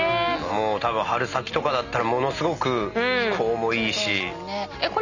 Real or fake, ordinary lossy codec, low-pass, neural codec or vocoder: real; none; 7.2 kHz; none